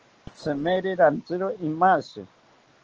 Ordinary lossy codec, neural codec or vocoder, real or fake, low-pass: Opus, 16 kbps; none; real; 7.2 kHz